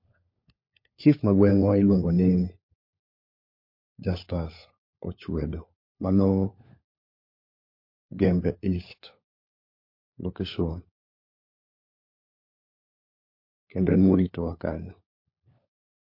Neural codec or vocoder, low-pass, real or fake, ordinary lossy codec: codec, 16 kHz, 4 kbps, FunCodec, trained on LibriTTS, 50 frames a second; 5.4 kHz; fake; MP3, 32 kbps